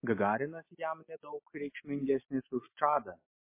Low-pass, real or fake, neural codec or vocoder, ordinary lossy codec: 3.6 kHz; real; none; MP3, 24 kbps